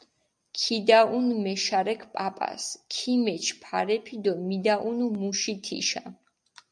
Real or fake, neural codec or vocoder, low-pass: real; none; 9.9 kHz